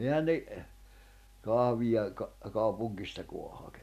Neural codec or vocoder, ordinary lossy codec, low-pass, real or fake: none; MP3, 96 kbps; 14.4 kHz; real